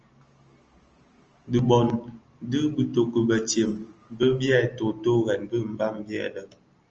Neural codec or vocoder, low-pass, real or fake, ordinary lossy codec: none; 7.2 kHz; real; Opus, 24 kbps